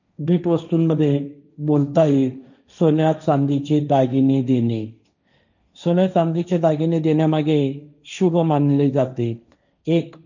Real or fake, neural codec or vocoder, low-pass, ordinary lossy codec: fake; codec, 16 kHz, 1.1 kbps, Voila-Tokenizer; 7.2 kHz; none